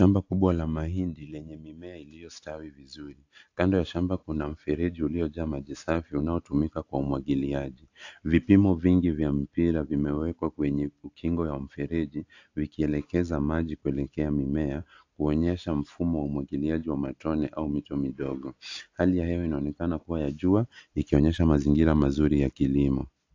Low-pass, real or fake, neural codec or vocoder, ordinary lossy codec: 7.2 kHz; real; none; AAC, 48 kbps